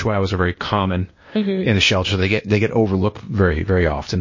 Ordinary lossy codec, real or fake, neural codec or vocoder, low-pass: MP3, 32 kbps; fake; codec, 16 kHz, 0.8 kbps, ZipCodec; 7.2 kHz